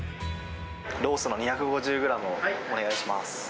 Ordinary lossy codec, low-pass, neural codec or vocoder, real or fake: none; none; none; real